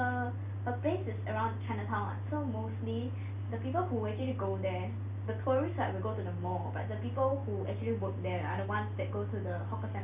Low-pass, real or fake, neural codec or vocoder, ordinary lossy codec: 3.6 kHz; real; none; none